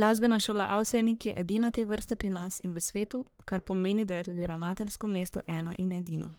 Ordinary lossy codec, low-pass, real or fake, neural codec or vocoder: none; none; fake; codec, 44.1 kHz, 1.7 kbps, Pupu-Codec